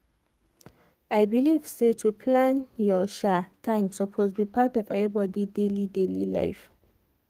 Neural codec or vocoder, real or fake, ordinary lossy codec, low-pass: codec, 32 kHz, 1.9 kbps, SNAC; fake; Opus, 32 kbps; 14.4 kHz